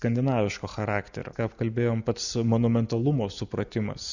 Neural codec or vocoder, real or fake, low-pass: none; real; 7.2 kHz